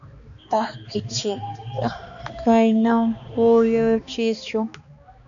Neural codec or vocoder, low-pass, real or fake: codec, 16 kHz, 2 kbps, X-Codec, HuBERT features, trained on balanced general audio; 7.2 kHz; fake